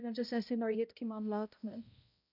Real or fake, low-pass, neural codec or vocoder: fake; 5.4 kHz; codec, 16 kHz, 0.5 kbps, X-Codec, HuBERT features, trained on balanced general audio